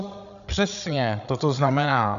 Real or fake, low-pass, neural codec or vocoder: fake; 7.2 kHz; codec, 16 kHz, 8 kbps, FreqCodec, larger model